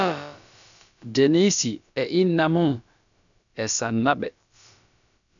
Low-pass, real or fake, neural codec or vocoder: 7.2 kHz; fake; codec, 16 kHz, about 1 kbps, DyCAST, with the encoder's durations